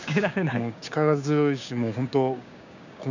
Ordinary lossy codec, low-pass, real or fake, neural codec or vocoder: none; 7.2 kHz; fake; codec, 16 kHz, 6 kbps, DAC